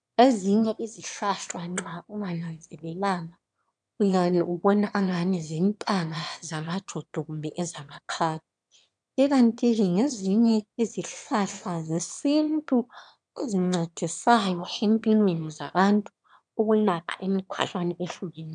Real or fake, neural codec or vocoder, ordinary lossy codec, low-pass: fake; autoencoder, 22.05 kHz, a latent of 192 numbers a frame, VITS, trained on one speaker; MP3, 96 kbps; 9.9 kHz